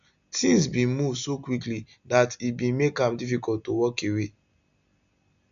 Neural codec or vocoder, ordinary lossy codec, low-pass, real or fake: none; none; 7.2 kHz; real